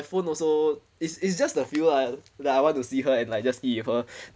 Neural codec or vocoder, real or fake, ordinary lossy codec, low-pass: none; real; none; none